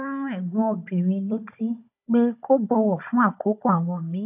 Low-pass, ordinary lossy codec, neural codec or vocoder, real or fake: 3.6 kHz; AAC, 32 kbps; codec, 16 kHz, 16 kbps, FunCodec, trained on Chinese and English, 50 frames a second; fake